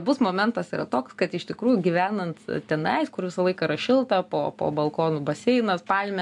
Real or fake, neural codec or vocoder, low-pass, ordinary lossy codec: real; none; 10.8 kHz; AAC, 64 kbps